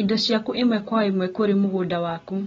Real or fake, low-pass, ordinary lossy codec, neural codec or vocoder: real; 7.2 kHz; AAC, 24 kbps; none